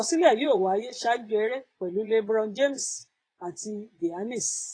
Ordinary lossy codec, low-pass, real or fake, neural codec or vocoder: AAC, 32 kbps; 9.9 kHz; fake; vocoder, 22.05 kHz, 80 mel bands, Vocos